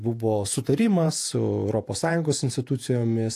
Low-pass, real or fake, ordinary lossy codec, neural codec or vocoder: 14.4 kHz; fake; AAC, 64 kbps; vocoder, 48 kHz, 128 mel bands, Vocos